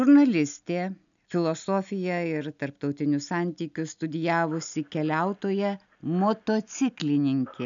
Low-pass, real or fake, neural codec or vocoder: 7.2 kHz; real; none